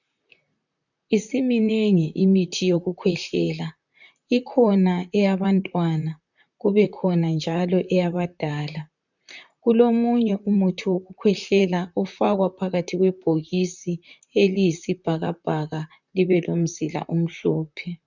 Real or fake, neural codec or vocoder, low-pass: fake; vocoder, 44.1 kHz, 128 mel bands, Pupu-Vocoder; 7.2 kHz